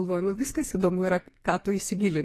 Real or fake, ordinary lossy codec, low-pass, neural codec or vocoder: fake; AAC, 48 kbps; 14.4 kHz; codec, 44.1 kHz, 2.6 kbps, SNAC